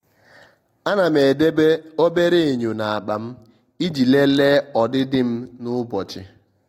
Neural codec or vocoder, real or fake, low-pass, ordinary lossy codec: none; real; 19.8 kHz; AAC, 48 kbps